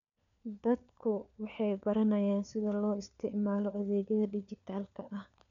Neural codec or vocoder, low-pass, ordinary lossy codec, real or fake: codec, 16 kHz, 4 kbps, FunCodec, trained on LibriTTS, 50 frames a second; 7.2 kHz; none; fake